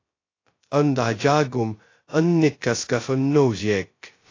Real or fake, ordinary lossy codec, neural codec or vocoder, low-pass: fake; AAC, 32 kbps; codec, 16 kHz, 0.2 kbps, FocalCodec; 7.2 kHz